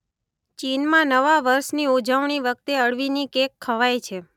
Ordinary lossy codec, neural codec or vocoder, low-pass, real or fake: none; none; 19.8 kHz; real